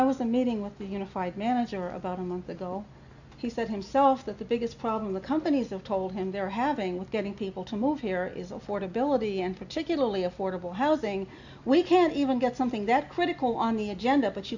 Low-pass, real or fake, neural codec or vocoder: 7.2 kHz; real; none